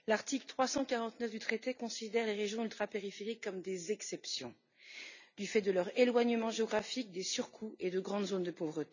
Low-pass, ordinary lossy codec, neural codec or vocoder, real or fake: 7.2 kHz; none; none; real